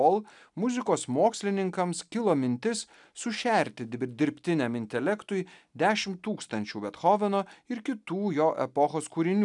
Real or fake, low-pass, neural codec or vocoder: real; 10.8 kHz; none